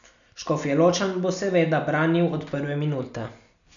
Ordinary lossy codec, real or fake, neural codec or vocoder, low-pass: none; real; none; 7.2 kHz